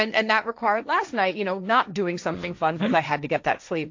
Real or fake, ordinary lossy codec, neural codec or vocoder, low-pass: fake; AAC, 48 kbps; codec, 16 kHz, 1.1 kbps, Voila-Tokenizer; 7.2 kHz